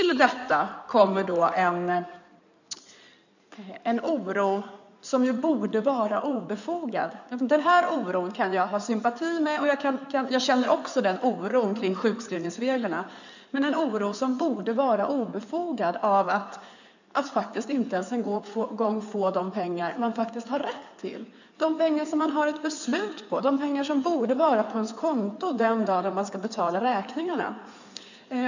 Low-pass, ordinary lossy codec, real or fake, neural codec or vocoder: 7.2 kHz; none; fake; codec, 16 kHz in and 24 kHz out, 2.2 kbps, FireRedTTS-2 codec